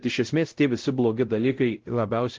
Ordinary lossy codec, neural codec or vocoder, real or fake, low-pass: Opus, 16 kbps; codec, 16 kHz, 0.5 kbps, X-Codec, WavLM features, trained on Multilingual LibriSpeech; fake; 7.2 kHz